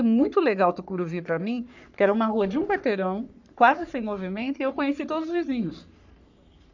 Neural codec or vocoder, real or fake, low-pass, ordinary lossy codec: codec, 44.1 kHz, 3.4 kbps, Pupu-Codec; fake; 7.2 kHz; none